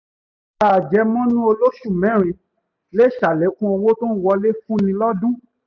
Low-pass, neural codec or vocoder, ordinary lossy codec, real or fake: 7.2 kHz; none; none; real